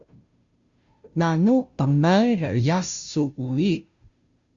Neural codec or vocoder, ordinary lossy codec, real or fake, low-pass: codec, 16 kHz, 0.5 kbps, FunCodec, trained on Chinese and English, 25 frames a second; Opus, 64 kbps; fake; 7.2 kHz